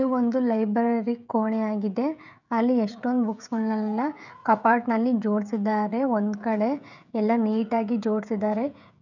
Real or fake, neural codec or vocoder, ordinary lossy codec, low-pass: fake; codec, 16 kHz, 16 kbps, FreqCodec, smaller model; none; 7.2 kHz